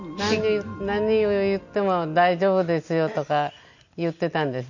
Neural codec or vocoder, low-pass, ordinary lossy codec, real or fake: none; 7.2 kHz; none; real